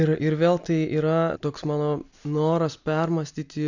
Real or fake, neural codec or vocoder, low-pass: real; none; 7.2 kHz